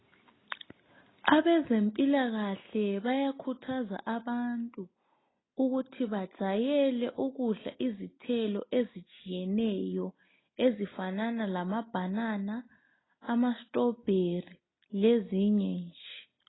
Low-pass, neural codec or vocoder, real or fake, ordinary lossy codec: 7.2 kHz; none; real; AAC, 16 kbps